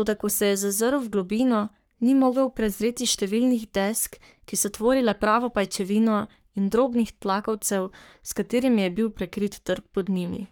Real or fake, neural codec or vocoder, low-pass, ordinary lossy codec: fake; codec, 44.1 kHz, 3.4 kbps, Pupu-Codec; none; none